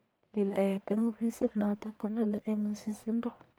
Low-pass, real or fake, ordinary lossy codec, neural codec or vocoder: none; fake; none; codec, 44.1 kHz, 1.7 kbps, Pupu-Codec